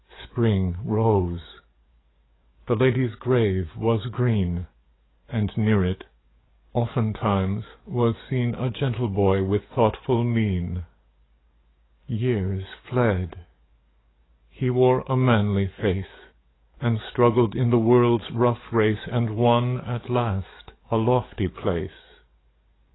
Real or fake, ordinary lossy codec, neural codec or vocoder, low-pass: fake; AAC, 16 kbps; codec, 44.1 kHz, 7.8 kbps, DAC; 7.2 kHz